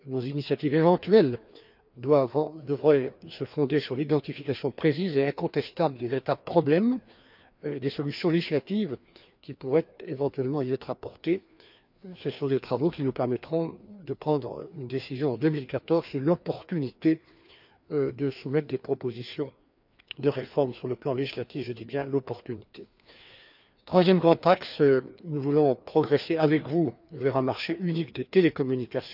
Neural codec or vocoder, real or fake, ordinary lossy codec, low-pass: codec, 16 kHz, 2 kbps, FreqCodec, larger model; fake; none; 5.4 kHz